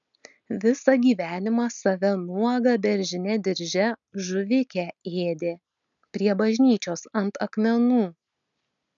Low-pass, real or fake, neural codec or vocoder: 7.2 kHz; real; none